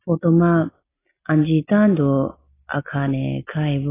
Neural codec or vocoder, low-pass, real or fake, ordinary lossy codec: none; 3.6 kHz; real; AAC, 16 kbps